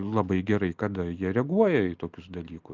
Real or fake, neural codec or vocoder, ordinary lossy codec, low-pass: real; none; Opus, 24 kbps; 7.2 kHz